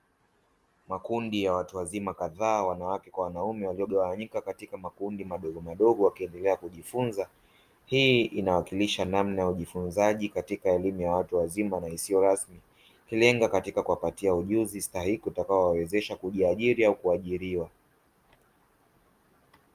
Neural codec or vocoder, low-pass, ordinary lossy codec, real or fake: none; 14.4 kHz; Opus, 32 kbps; real